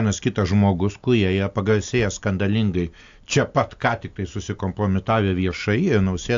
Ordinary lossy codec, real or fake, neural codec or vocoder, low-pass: AAC, 64 kbps; real; none; 7.2 kHz